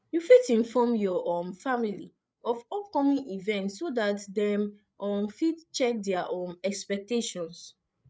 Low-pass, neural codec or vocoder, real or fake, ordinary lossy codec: none; codec, 16 kHz, 8 kbps, FreqCodec, larger model; fake; none